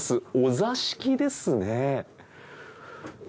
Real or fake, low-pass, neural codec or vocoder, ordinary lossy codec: real; none; none; none